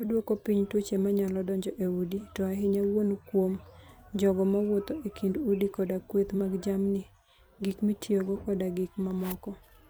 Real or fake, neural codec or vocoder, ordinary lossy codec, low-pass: fake; vocoder, 44.1 kHz, 128 mel bands every 256 samples, BigVGAN v2; none; none